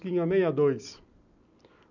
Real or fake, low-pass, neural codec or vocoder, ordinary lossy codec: real; 7.2 kHz; none; none